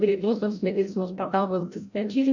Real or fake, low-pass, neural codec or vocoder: fake; 7.2 kHz; codec, 16 kHz, 0.5 kbps, FreqCodec, larger model